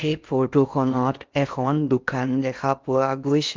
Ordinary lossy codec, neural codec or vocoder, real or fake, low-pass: Opus, 32 kbps; codec, 16 kHz in and 24 kHz out, 0.6 kbps, FocalCodec, streaming, 4096 codes; fake; 7.2 kHz